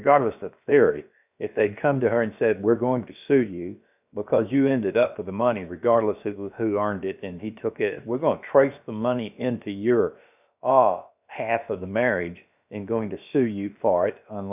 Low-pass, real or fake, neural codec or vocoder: 3.6 kHz; fake; codec, 16 kHz, about 1 kbps, DyCAST, with the encoder's durations